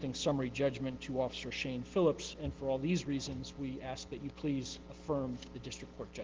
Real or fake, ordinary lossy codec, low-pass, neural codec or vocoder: real; Opus, 16 kbps; 7.2 kHz; none